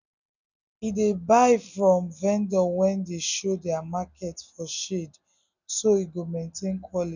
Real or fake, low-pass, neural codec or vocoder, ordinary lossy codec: real; 7.2 kHz; none; none